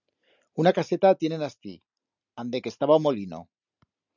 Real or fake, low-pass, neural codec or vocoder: real; 7.2 kHz; none